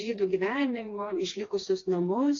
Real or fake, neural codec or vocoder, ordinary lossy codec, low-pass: fake; codec, 16 kHz, 2 kbps, FreqCodec, smaller model; AAC, 32 kbps; 7.2 kHz